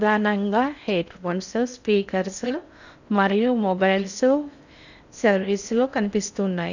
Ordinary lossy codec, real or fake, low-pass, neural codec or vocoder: none; fake; 7.2 kHz; codec, 16 kHz in and 24 kHz out, 0.6 kbps, FocalCodec, streaming, 4096 codes